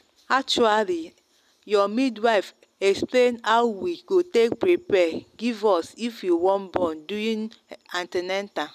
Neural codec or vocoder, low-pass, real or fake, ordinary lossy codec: none; 14.4 kHz; real; none